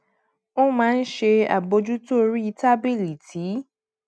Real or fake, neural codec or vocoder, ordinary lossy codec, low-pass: real; none; none; none